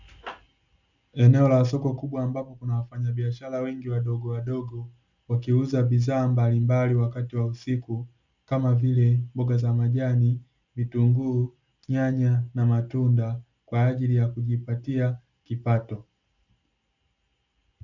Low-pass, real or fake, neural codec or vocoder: 7.2 kHz; real; none